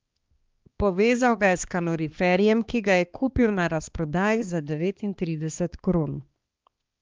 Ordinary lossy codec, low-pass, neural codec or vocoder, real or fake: Opus, 32 kbps; 7.2 kHz; codec, 16 kHz, 2 kbps, X-Codec, HuBERT features, trained on balanced general audio; fake